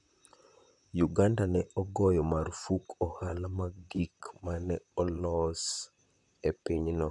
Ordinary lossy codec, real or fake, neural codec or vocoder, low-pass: none; fake; vocoder, 44.1 kHz, 128 mel bands, Pupu-Vocoder; 10.8 kHz